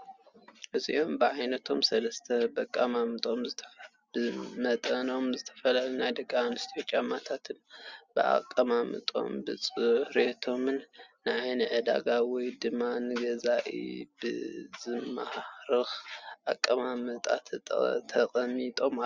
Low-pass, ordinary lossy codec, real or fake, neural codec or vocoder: 7.2 kHz; Opus, 64 kbps; real; none